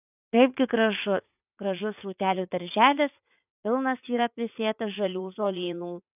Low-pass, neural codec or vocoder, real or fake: 3.6 kHz; vocoder, 44.1 kHz, 80 mel bands, Vocos; fake